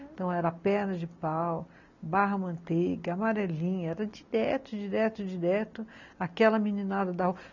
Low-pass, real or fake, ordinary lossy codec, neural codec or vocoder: 7.2 kHz; real; none; none